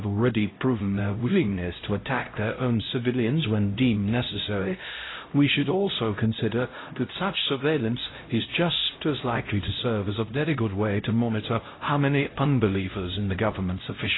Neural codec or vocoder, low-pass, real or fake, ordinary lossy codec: codec, 16 kHz, 0.5 kbps, X-Codec, HuBERT features, trained on LibriSpeech; 7.2 kHz; fake; AAC, 16 kbps